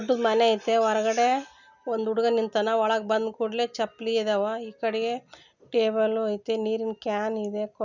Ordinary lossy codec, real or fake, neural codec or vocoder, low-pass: none; real; none; 7.2 kHz